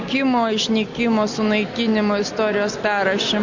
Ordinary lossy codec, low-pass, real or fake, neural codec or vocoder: MP3, 64 kbps; 7.2 kHz; real; none